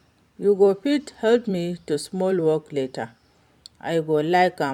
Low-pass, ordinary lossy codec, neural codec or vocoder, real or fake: 19.8 kHz; none; vocoder, 44.1 kHz, 128 mel bands every 256 samples, BigVGAN v2; fake